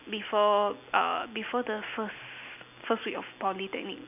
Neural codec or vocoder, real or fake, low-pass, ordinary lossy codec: none; real; 3.6 kHz; none